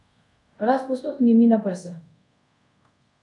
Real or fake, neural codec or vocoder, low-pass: fake; codec, 24 kHz, 0.5 kbps, DualCodec; 10.8 kHz